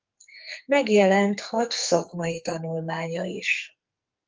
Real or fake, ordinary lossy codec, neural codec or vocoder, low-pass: fake; Opus, 24 kbps; codec, 44.1 kHz, 2.6 kbps, SNAC; 7.2 kHz